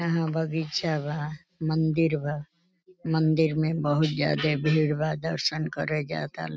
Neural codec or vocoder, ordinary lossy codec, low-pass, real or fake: none; none; none; real